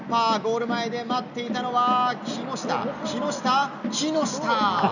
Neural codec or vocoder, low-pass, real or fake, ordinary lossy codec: none; 7.2 kHz; real; none